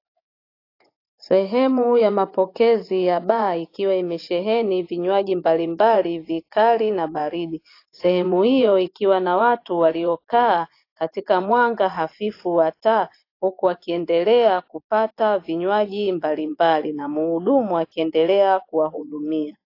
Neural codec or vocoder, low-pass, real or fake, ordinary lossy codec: vocoder, 24 kHz, 100 mel bands, Vocos; 5.4 kHz; fake; AAC, 32 kbps